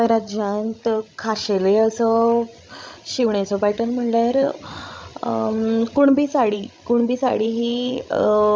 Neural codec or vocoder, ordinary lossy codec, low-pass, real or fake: codec, 16 kHz, 16 kbps, FreqCodec, larger model; none; none; fake